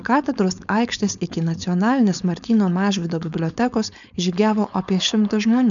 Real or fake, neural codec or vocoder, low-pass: fake; codec, 16 kHz, 4.8 kbps, FACodec; 7.2 kHz